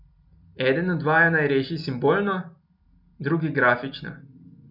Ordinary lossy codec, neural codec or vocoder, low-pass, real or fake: none; none; 5.4 kHz; real